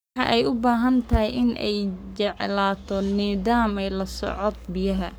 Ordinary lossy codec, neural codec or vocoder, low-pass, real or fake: none; codec, 44.1 kHz, 7.8 kbps, Pupu-Codec; none; fake